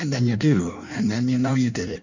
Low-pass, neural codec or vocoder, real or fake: 7.2 kHz; codec, 16 kHz in and 24 kHz out, 1.1 kbps, FireRedTTS-2 codec; fake